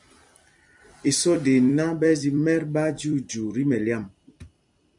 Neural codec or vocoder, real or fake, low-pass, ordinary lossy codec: vocoder, 44.1 kHz, 128 mel bands every 256 samples, BigVGAN v2; fake; 10.8 kHz; MP3, 96 kbps